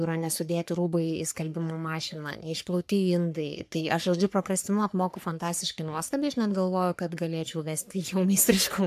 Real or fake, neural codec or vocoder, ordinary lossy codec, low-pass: fake; codec, 44.1 kHz, 3.4 kbps, Pupu-Codec; AAC, 96 kbps; 14.4 kHz